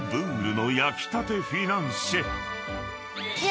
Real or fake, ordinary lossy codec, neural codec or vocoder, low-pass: real; none; none; none